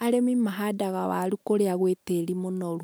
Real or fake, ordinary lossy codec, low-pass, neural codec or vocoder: real; none; none; none